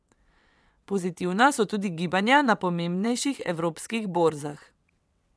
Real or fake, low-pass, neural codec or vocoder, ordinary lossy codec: fake; none; vocoder, 22.05 kHz, 80 mel bands, WaveNeXt; none